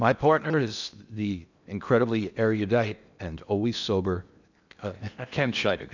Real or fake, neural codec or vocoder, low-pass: fake; codec, 16 kHz in and 24 kHz out, 0.8 kbps, FocalCodec, streaming, 65536 codes; 7.2 kHz